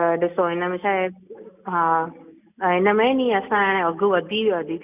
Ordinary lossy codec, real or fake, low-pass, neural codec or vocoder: none; real; 3.6 kHz; none